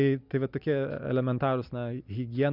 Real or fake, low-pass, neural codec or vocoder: real; 5.4 kHz; none